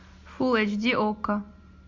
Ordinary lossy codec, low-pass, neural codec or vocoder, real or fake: MP3, 64 kbps; 7.2 kHz; none; real